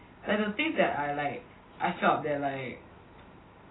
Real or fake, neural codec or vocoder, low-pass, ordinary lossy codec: real; none; 7.2 kHz; AAC, 16 kbps